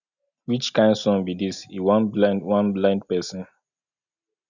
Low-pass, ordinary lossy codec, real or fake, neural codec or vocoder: 7.2 kHz; none; real; none